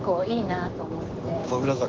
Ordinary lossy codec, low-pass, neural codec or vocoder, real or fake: Opus, 16 kbps; 7.2 kHz; codec, 16 kHz, 6 kbps, DAC; fake